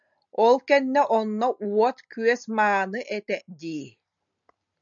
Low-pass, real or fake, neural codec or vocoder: 7.2 kHz; real; none